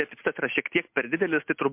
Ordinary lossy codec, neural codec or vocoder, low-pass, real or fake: MP3, 32 kbps; none; 3.6 kHz; real